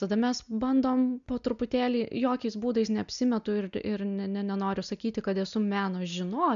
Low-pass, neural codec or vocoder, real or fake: 7.2 kHz; none; real